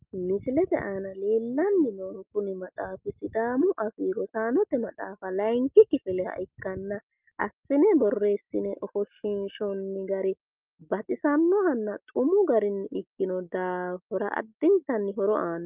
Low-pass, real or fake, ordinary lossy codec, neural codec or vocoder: 3.6 kHz; real; Opus, 24 kbps; none